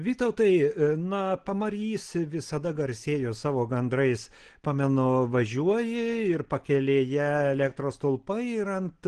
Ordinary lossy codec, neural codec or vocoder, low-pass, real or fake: Opus, 16 kbps; none; 9.9 kHz; real